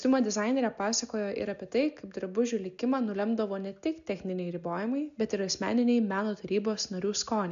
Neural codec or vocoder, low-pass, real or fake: none; 7.2 kHz; real